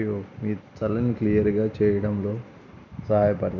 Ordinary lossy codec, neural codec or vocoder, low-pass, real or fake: none; none; 7.2 kHz; real